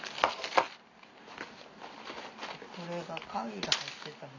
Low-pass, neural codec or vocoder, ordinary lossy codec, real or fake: 7.2 kHz; none; AAC, 48 kbps; real